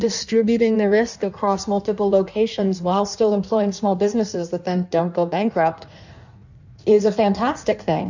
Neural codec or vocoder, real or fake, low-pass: codec, 16 kHz in and 24 kHz out, 1.1 kbps, FireRedTTS-2 codec; fake; 7.2 kHz